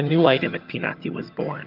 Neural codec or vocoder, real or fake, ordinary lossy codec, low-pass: vocoder, 22.05 kHz, 80 mel bands, HiFi-GAN; fake; Opus, 32 kbps; 5.4 kHz